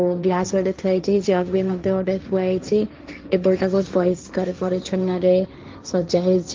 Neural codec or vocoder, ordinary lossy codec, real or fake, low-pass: codec, 16 kHz, 1.1 kbps, Voila-Tokenizer; Opus, 32 kbps; fake; 7.2 kHz